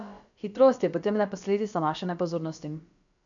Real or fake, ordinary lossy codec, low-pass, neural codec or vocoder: fake; none; 7.2 kHz; codec, 16 kHz, about 1 kbps, DyCAST, with the encoder's durations